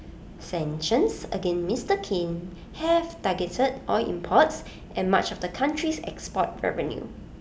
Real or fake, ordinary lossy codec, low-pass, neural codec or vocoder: real; none; none; none